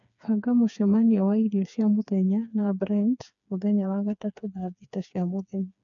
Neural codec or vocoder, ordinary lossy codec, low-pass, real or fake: codec, 16 kHz, 4 kbps, FreqCodec, smaller model; none; 7.2 kHz; fake